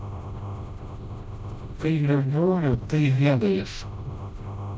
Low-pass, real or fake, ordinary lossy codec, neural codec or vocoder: none; fake; none; codec, 16 kHz, 0.5 kbps, FreqCodec, smaller model